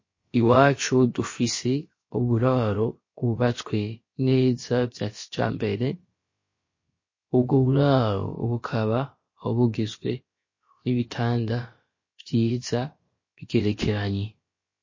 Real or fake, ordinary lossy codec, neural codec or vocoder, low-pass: fake; MP3, 32 kbps; codec, 16 kHz, about 1 kbps, DyCAST, with the encoder's durations; 7.2 kHz